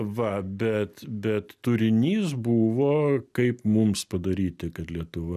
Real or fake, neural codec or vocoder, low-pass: real; none; 14.4 kHz